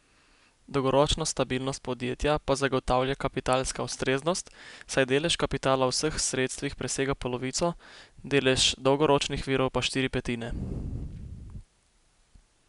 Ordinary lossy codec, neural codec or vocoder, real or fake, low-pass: none; none; real; 10.8 kHz